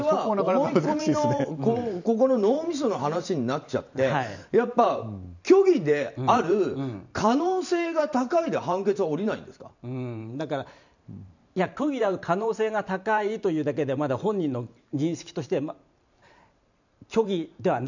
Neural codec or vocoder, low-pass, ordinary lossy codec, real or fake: vocoder, 44.1 kHz, 128 mel bands every 512 samples, BigVGAN v2; 7.2 kHz; none; fake